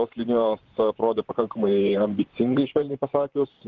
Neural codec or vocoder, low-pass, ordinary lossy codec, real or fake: vocoder, 44.1 kHz, 128 mel bands, Pupu-Vocoder; 7.2 kHz; Opus, 16 kbps; fake